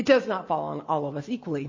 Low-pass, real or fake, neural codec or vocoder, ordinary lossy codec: 7.2 kHz; real; none; MP3, 32 kbps